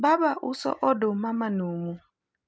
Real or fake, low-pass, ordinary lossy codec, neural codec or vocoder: real; none; none; none